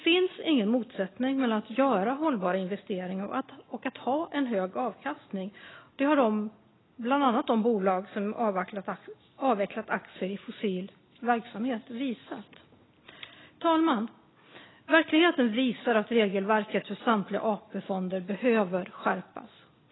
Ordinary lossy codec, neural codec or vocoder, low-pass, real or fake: AAC, 16 kbps; none; 7.2 kHz; real